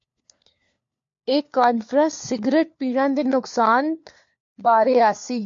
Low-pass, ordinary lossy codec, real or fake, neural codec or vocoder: 7.2 kHz; MP3, 48 kbps; fake; codec, 16 kHz, 4 kbps, FunCodec, trained on LibriTTS, 50 frames a second